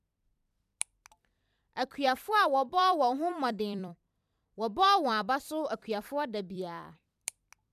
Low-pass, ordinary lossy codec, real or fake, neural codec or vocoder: 14.4 kHz; none; fake; vocoder, 44.1 kHz, 128 mel bands every 256 samples, BigVGAN v2